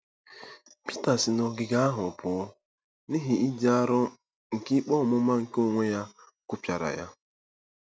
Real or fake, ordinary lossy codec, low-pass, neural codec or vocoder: real; none; none; none